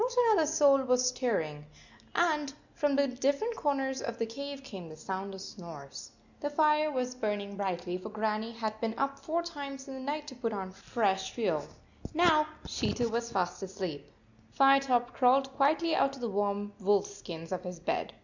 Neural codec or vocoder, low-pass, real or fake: none; 7.2 kHz; real